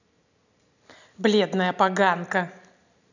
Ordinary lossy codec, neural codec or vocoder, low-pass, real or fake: none; none; 7.2 kHz; real